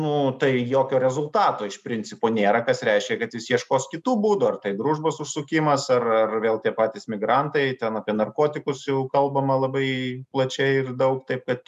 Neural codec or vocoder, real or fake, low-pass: none; real; 14.4 kHz